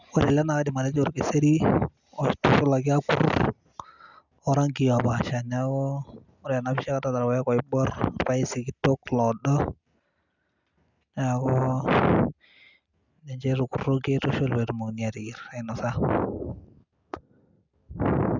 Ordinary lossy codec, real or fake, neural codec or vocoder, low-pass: none; real; none; 7.2 kHz